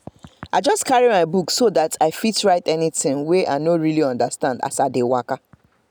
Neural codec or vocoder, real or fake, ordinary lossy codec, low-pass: none; real; none; none